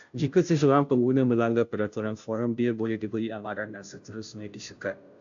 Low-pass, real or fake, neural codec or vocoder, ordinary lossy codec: 7.2 kHz; fake; codec, 16 kHz, 0.5 kbps, FunCodec, trained on Chinese and English, 25 frames a second; AAC, 64 kbps